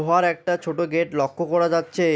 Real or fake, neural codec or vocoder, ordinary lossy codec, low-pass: real; none; none; none